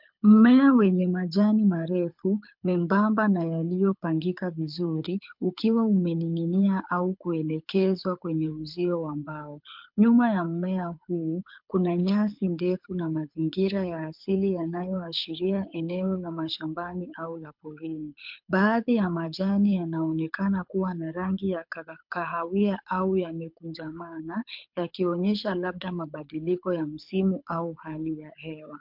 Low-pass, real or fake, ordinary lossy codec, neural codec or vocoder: 5.4 kHz; fake; MP3, 48 kbps; codec, 24 kHz, 6 kbps, HILCodec